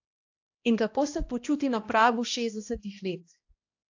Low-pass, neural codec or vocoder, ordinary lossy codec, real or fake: 7.2 kHz; codec, 16 kHz, 1 kbps, X-Codec, HuBERT features, trained on balanced general audio; AAC, 48 kbps; fake